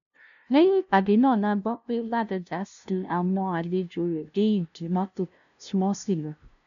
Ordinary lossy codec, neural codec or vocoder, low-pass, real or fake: none; codec, 16 kHz, 0.5 kbps, FunCodec, trained on LibriTTS, 25 frames a second; 7.2 kHz; fake